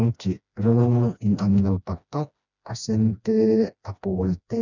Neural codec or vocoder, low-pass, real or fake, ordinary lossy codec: codec, 16 kHz, 1 kbps, FreqCodec, smaller model; 7.2 kHz; fake; none